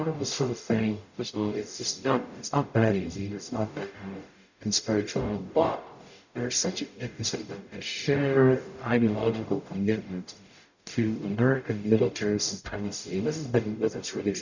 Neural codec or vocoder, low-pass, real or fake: codec, 44.1 kHz, 0.9 kbps, DAC; 7.2 kHz; fake